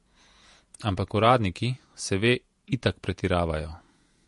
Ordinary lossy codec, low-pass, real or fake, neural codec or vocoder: MP3, 48 kbps; 14.4 kHz; fake; vocoder, 48 kHz, 128 mel bands, Vocos